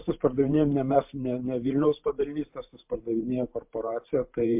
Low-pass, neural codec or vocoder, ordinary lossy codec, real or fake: 3.6 kHz; vocoder, 44.1 kHz, 128 mel bands every 512 samples, BigVGAN v2; MP3, 32 kbps; fake